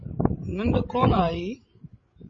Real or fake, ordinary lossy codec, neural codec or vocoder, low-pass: fake; MP3, 32 kbps; codec, 16 kHz, 16 kbps, FreqCodec, larger model; 7.2 kHz